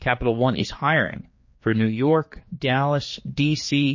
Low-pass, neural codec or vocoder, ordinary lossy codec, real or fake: 7.2 kHz; codec, 16 kHz, 4 kbps, X-Codec, HuBERT features, trained on general audio; MP3, 32 kbps; fake